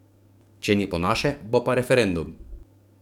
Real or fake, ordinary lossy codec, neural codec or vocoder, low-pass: fake; none; codec, 44.1 kHz, 7.8 kbps, DAC; 19.8 kHz